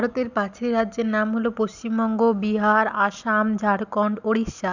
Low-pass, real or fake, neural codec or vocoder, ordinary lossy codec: 7.2 kHz; real; none; none